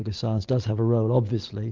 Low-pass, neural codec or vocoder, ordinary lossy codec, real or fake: 7.2 kHz; none; Opus, 32 kbps; real